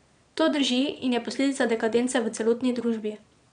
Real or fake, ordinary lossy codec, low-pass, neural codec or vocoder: fake; none; 9.9 kHz; vocoder, 22.05 kHz, 80 mel bands, Vocos